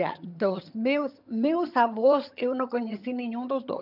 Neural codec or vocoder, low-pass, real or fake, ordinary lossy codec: vocoder, 22.05 kHz, 80 mel bands, HiFi-GAN; 5.4 kHz; fake; none